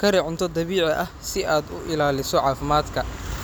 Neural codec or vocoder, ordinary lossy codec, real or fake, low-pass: none; none; real; none